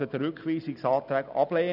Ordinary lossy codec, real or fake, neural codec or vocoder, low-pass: none; real; none; 5.4 kHz